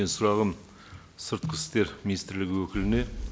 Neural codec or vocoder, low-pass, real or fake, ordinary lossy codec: none; none; real; none